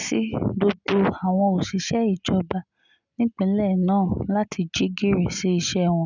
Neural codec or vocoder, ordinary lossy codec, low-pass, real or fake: none; none; 7.2 kHz; real